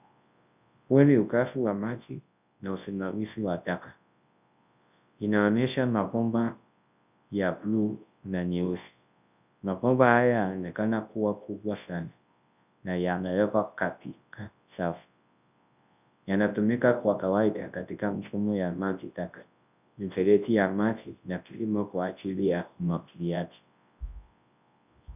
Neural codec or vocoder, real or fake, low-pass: codec, 24 kHz, 0.9 kbps, WavTokenizer, large speech release; fake; 3.6 kHz